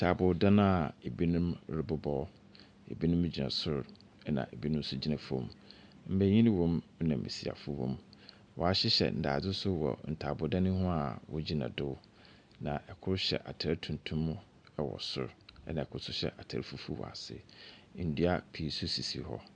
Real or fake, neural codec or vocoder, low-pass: real; none; 9.9 kHz